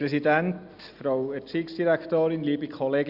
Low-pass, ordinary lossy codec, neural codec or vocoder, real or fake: 5.4 kHz; Opus, 64 kbps; none; real